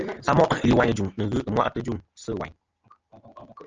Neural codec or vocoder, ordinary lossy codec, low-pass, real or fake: none; Opus, 24 kbps; 7.2 kHz; real